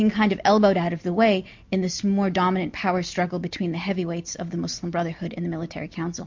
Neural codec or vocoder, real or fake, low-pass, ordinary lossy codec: none; real; 7.2 kHz; MP3, 48 kbps